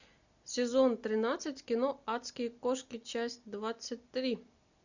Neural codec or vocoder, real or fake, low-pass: none; real; 7.2 kHz